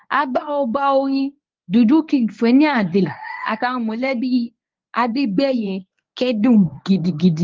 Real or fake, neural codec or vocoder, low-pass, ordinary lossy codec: fake; codec, 24 kHz, 0.9 kbps, WavTokenizer, medium speech release version 1; 7.2 kHz; Opus, 32 kbps